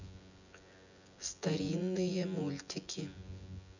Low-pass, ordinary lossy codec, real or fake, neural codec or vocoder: 7.2 kHz; none; fake; vocoder, 24 kHz, 100 mel bands, Vocos